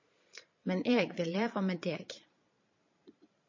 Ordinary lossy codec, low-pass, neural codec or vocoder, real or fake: MP3, 32 kbps; 7.2 kHz; vocoder, 22.05 kHz, 80 mel bands, Vocos; fake